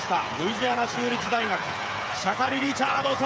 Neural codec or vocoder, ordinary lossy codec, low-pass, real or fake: codec, 16 kHz, 8 kbps, FreqCodec, smaller model; none; none; fake